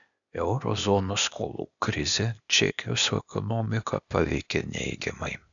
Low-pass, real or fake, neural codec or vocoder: 7.2 kHz; fake; codec, 16 kHz, 0.8 kbps, ZipCodec